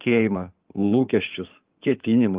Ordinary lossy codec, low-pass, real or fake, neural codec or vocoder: Opus, 32 kbps; 3.6 kHz; fake; codec, 16 kHz in and 24 kHz out, 2.2 kbps, FireRedTTS-2 codec